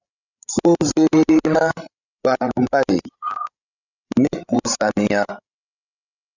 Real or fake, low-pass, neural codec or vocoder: fake; 7.2 kHz; codec, 16 kHz, 16 kbps, FreqCodec, larger model